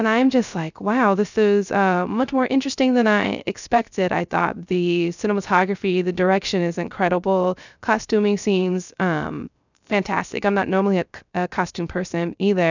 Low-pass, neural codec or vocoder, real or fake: 7.2 kHz; codec, 16 kHz, 0.3 kbps, FocalCodec; fake